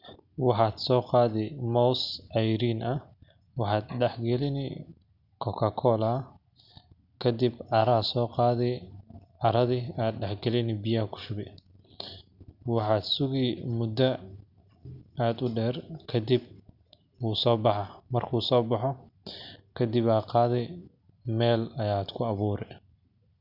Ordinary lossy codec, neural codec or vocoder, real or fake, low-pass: none; none; real; 5.4 kHz